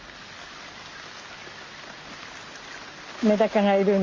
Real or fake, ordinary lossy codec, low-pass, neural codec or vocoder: real; Opus, 32 kbps; 7.2 kHz; none